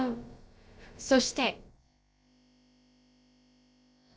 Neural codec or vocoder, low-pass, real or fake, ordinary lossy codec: codec, 16 kHz, about 1 kbps, DyCAST, with the encoder's durations; none; fake; none